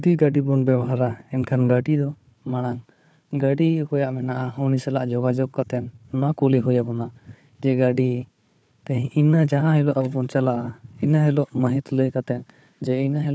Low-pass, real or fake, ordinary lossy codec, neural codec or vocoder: none; fake; none; codec, 16 kHz, 4 kbps, FunCodec, trained on Chinese and English, 50 frames a second